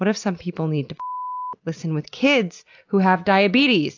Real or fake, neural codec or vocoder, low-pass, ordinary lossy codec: real; none; 7.2 kHz; AAC, 48 kbps